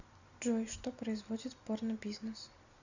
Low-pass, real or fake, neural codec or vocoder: 7.2 kHz; real; none